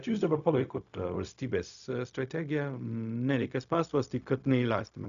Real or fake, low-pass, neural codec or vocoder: fake; 7.2 kHz; codec, 16 kHz, 0.4 kbps, LongCat-Audio-Codec